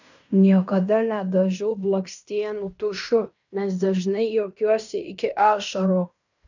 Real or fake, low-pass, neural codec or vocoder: fake; 7.2 kHz; codec, 16 kHz in and 24 kHz out, 0.9 kbps, LongCat-Audio-Codec, fine tuned four codebook decoder